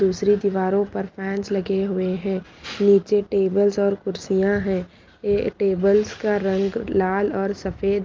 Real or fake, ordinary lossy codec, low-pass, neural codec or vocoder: real; Opus, 24 kbps; 7.2 kHz; none